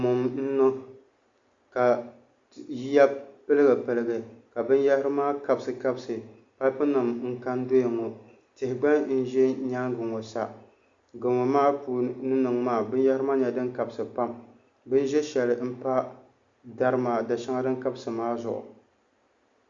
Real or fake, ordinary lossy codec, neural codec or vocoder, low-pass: real; Opus, 64 kbps; none; 7.2 kHz